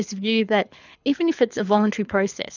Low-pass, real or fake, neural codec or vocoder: 7.2 kHz; fake; codec, 24 kHz, 6 kbps, HILCodec